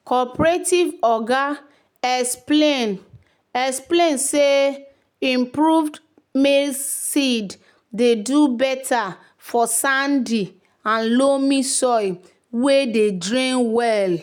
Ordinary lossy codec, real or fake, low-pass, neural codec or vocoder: none; real; 19.8 kHz; none